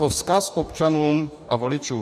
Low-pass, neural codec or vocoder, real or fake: 14.4 kHz; codec, 44.1 kHz, 2.6 kbps, DAC; fake